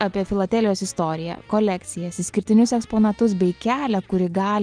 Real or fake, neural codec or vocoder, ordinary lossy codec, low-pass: real; none; Opus, 24 kbps; 9.9 kHz